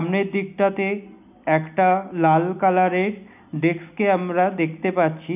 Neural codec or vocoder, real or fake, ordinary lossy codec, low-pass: none; real; none; 3.6 kHz